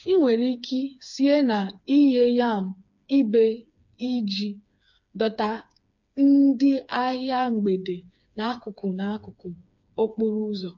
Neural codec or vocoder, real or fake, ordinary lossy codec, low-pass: codec, 16 kHz, 4 kbps, FreqCodec, smaller model; fake; MP3, 48 kbps; 7.2 kHz